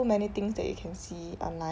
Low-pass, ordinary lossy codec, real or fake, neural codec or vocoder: none; none; real; none